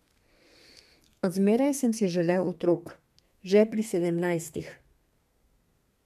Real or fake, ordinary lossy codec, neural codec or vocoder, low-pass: fake; MP3, 96 kbps; codec, 32 kHz, 1.9 kbps, SNAC; 14.4 kHz